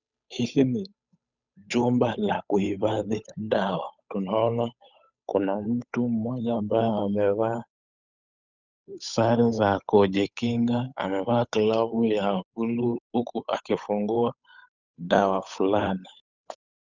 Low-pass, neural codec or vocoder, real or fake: 7.2 kHz; codec, 16 kHz, 8 kbps, FunCodec, trained on Chinese and English, 25 frames a second; fake